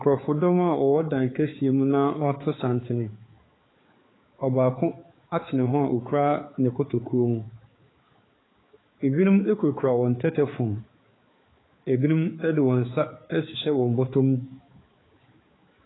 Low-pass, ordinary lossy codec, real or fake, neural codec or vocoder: 7.2 kHz; AAC, 16 kbps; fake; codec, 16 kHz, 4 kbps, X-Codec, HuBERT features, trained on balanced general audio